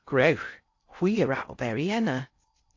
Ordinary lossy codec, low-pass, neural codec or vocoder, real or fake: AAC, 48 kbps; 7.2 kHz; codec, 16 kHz in and 24 kHz out, 0.6 kbps, FocalCodec, streaming, 2048 codes; fake